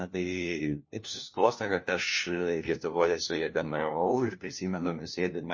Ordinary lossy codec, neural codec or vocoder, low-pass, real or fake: MP3, 32 kbps; codec, 16 kHz, 1 kbps, FunCodec, trained on LibriTTS, 50 frames a second; 7.2 kHz; fake